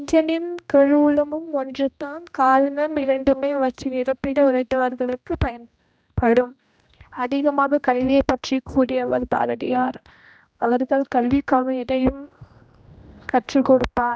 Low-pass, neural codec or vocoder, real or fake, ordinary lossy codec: none; codec, 16 kHz, 1 kbps, X-Codec, HuBERT features, trained on general audio; fake; none